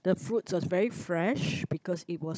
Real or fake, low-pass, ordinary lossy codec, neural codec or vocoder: fake; none; none; codec, 16 kHz, 16 kbps, FunCodec, trained on Chinese and English, 50 frames a second